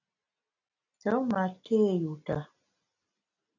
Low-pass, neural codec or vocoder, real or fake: 7.2 kHz; none; real